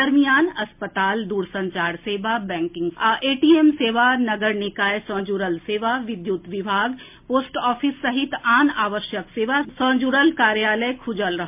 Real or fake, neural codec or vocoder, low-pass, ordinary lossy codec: real; none; 3.6 kHz; MP3, 32 kbps